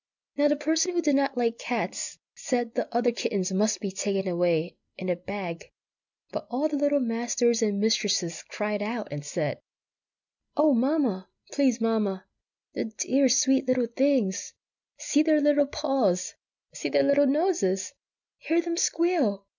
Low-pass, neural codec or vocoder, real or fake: 7.2 kHz; none; real